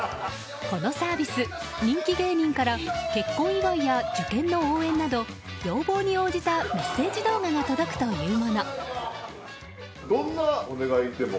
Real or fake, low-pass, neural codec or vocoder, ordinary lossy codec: real; none; none; none